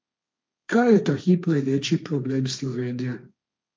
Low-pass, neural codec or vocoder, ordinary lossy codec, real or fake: none; codec, 16 kHz, 1.1 kbps, Voila-Tokenizer; none; fake